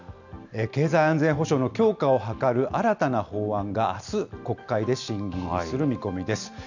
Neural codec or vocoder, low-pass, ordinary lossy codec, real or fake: none; 7.2 kHz; none; real